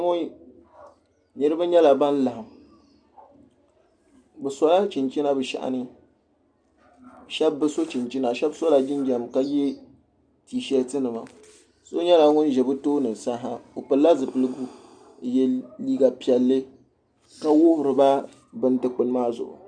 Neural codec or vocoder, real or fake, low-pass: none; real; 9.9 kHz